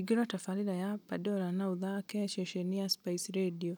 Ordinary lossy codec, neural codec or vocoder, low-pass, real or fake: none; none; none; real